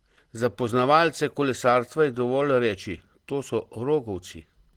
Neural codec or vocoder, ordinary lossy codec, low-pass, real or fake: none; Opus, 16 kbps; 19.8 kHz; real